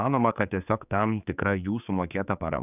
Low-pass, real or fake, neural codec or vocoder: 3.6 kHz; fake; codec, 16 kHz, 4 kbps, X-Codec, HuBERT features, trained on general audio